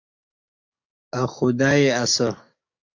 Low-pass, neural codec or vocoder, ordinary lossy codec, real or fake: 7.2 kHz; codec, 44.1 kHz, 7.8 kbps, DAC; AAC, 48 kbps; fake